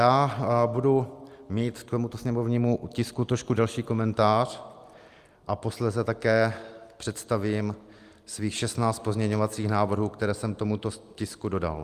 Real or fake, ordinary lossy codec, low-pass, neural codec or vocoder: real; Opus, 32 kbps; 14.4 kHz; none